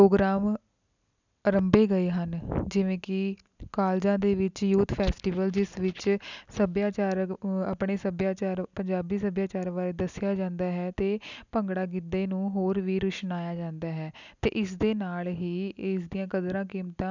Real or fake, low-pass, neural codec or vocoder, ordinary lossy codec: real; 7.2 kHz; none; none